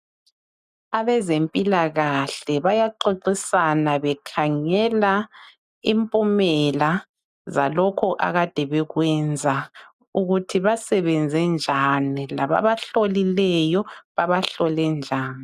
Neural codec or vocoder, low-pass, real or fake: none; 14.4 kHz; real